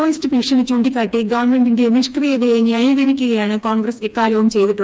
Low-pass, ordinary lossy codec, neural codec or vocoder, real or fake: none; none; codec, 16 kHz, 2 kbps, FreqCodec, smaller model; fake